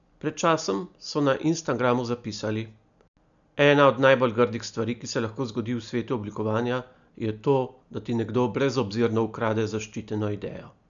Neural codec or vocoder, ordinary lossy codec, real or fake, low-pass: none; none; real; 7.2 kHz